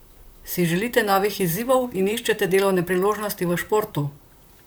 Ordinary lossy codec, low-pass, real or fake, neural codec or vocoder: none; none; fake; vocoder, 44.1 kHz, 128 mel bands, Pupu-Vocoder